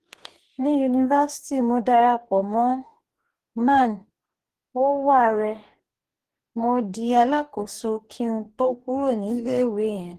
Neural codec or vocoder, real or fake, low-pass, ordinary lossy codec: codec, 44.1 kHz, 2.6 kbps, DAC; fake; 14.4 kHz; Opus, 16 kbps